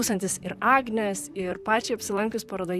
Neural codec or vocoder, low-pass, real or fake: vocoder, 44.1 kHz, 128 mel bands, Pupu-Vocoder; 14.4 kHz; fake